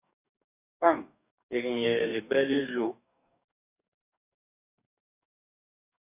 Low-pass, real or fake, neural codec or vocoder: 3.6 kHz; fake; codec, 44.1 kHz, 2.6 kbps, DAC